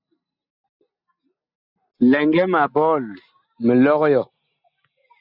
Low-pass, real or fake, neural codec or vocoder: 5.4 kHz; real; none